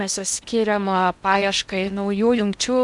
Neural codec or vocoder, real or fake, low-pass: codec, 16 kHz in and 24 kHz out, 0.6 kbps, FocalCodec, streaming, 2048 codes; fake; 10.8 kHz